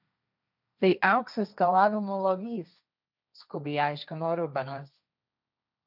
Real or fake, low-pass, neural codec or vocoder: fake; 5.4 kHz; codec, 16 kHz, 1.1 kbps, Voila-Tokenizer